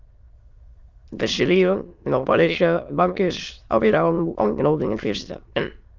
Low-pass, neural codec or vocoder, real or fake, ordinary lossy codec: 7.2 kHz; autoencoder, 22.05 kHz, a latent of 192 numbers a frame, VITS, trained on many speakers; fake; Opus, 32 kbps